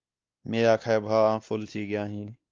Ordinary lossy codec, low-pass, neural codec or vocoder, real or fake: Opus, 24 kbps; 7.2 kHz; codec, 16 kHz, 2 kbps, X-Codec, WavLM features, trained on Multilingual LibriSpeech; fake